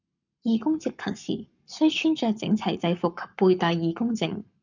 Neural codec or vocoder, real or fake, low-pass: codec, 44.1 kHz, 7.8 kbps, Pupu-Codec; fake; 7.2 kHz